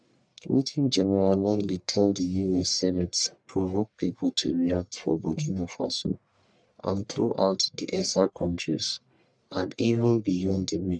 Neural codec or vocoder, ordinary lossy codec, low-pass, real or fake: codec, 44.1 kHz, 1.7 kbps, Pupu-Codec; none; 9.9 kHz; fake